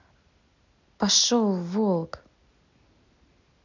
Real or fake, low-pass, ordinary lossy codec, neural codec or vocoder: real; 7.2 kHz; none; none